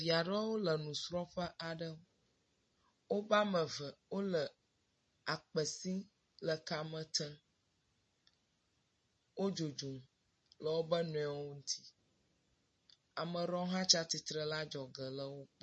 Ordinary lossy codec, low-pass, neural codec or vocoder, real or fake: MP3, 32 kbps; 9.9 kHz; none; real